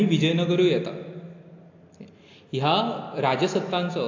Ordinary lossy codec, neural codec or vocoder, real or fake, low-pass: AAC, 48 kbps; none; real; 7.2 kHz